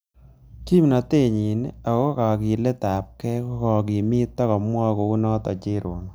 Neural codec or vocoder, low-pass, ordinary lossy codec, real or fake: none; none; none; real